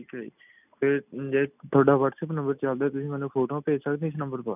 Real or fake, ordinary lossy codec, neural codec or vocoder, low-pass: real; none; none; 3.6 kHz